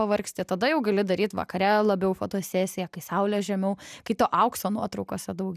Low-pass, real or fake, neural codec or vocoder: 14.4 kHz; real; none